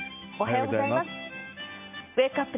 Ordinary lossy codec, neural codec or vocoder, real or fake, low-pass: MP3, 32 kbps; none; real; 3.6 kHz